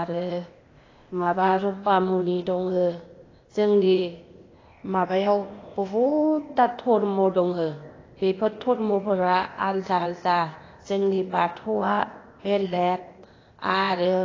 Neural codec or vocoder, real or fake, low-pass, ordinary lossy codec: codec, 16 kHz, 0.8 kbps, ZipCodec; fake; 7.2 kHz; AAC, 32 kbps